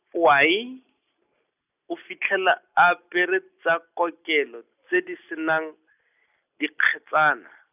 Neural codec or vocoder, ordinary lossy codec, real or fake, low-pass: none; none; real; 3.6 kHz